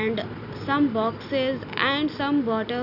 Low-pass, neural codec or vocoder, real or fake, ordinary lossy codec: 5.4 kHz; none; real; none